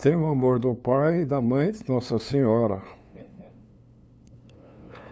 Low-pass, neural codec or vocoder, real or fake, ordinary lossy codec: none; codec, 16 kHz, 2 kbps, FunCodec, trained on LibriTTS, 25 frames a second; fake; none